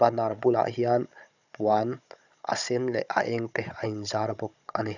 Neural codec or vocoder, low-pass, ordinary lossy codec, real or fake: codec, 16 kHz, 16 kbps, FunCodec, trained on Chinese and English, 50 frames a second; 7.2 kHz; none; fake